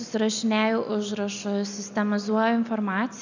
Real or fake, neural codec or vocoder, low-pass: real; none; 7.2 kHz